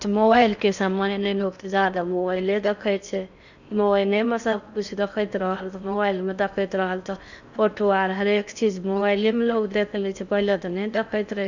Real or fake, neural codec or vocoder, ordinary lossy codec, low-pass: fake; codec, 16 kHz in and 24 kHz out, 0.6 kbps, FocalCodec, streaming, 4096 codes; none; 7.2 kHz